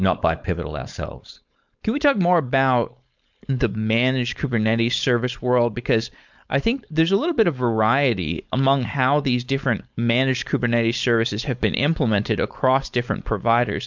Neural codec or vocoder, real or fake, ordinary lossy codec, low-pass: codec, 16 kHz, 4.8 kbps, FACodec; fake; MP3, 64 kbps; 7.2 kHz